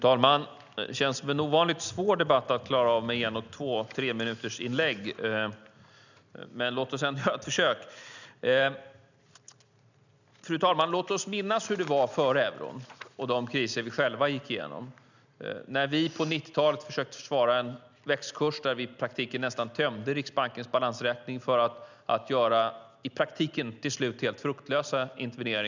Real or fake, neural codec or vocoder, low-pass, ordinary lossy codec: real; none; 7.2 kHz; none